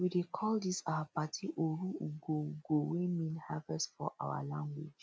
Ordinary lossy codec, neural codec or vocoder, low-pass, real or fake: none; none; none; real